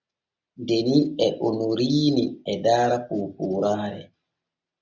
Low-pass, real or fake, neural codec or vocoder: 7.2 kHz; real; none